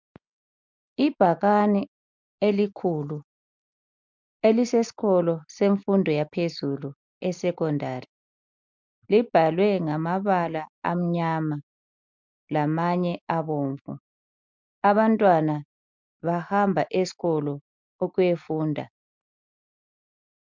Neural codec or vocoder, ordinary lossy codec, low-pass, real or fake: none; MP3, 64 kbps; 7.2 kHz; real